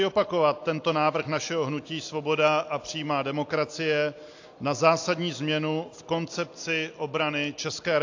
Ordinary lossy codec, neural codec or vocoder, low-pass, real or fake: AAC, 48 kbps; none; 7.2 kHz; real